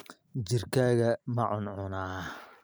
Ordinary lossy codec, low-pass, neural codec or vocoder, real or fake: none; none; none; real